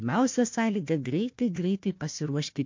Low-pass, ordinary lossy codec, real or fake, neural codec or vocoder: 7.2 kHz; MP3, 48 kbps; fake; codec, 16 kHz, 1 kbps, FunCodec, trained on Chinese and English, 50 frames a second